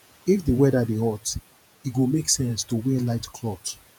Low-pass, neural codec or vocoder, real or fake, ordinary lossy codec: none; vocoder, 48 kHz, 128 mel bands, Vocos; fake; none